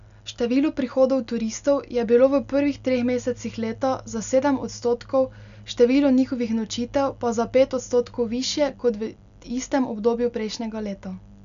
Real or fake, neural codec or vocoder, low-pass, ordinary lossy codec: real; none; 7.2 kHz; none